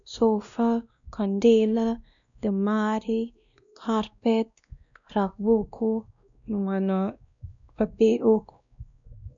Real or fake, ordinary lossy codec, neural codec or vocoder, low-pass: fake; none; codec, 16 kHz, 1 kbps, X-Codec, WavLM features, trained on Multilingual LibriSpeech; 7.2 kHz